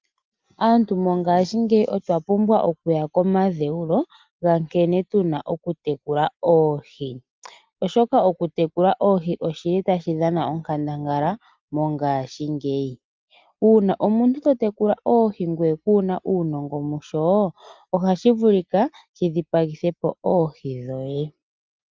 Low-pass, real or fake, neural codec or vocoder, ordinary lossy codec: 7.2 kHz; real; none; Opus, 24 kbps